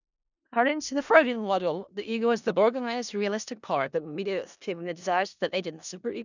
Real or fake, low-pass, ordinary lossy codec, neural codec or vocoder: fake; 7.2 kHz; none; codec, 16 kHz in and 24 kHz out, 0.4 kbps, LongCat-Audio-Codec, four codebook decoder